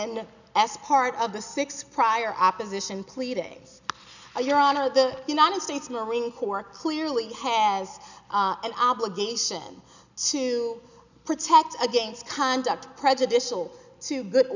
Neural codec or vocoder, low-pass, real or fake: none; 7.2 kHz; real